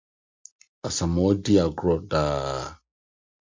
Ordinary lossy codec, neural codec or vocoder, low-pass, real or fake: MP3, 64 kbps; none; 7.2 kHz; real